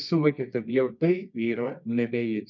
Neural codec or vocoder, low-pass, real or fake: codec, 24 kHz, 0.9 kbps, WavTokenizer, medium music audio release; 7.2 kHz; fake